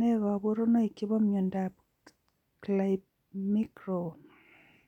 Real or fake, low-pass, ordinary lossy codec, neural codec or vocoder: real; 19.8 kHz; none; none